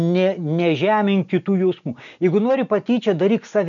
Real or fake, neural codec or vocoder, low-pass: real; none; 7.2 kHz